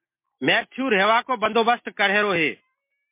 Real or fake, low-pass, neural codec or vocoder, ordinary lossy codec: real; 3.6 kHz; none; MP3, 24 kbps